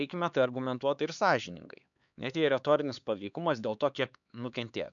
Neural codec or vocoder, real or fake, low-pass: codec, 16 kHz, 4 kbps, X-Codec, HuBERT features, trained on LibriSpeech; fake; 7.2 kHz